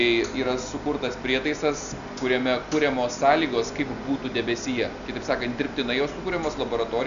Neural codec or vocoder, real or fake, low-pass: none; real; 7.2 kHz